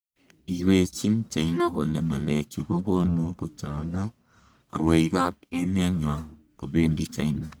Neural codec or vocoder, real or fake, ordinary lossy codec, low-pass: codec, 44.1 kHz, 1.7 kbps, Pupu-Codec; fake; none; none